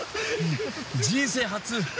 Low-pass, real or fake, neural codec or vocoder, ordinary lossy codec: none; real; none; none